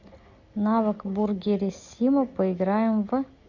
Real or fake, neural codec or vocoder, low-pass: real; none; 7.2 kHz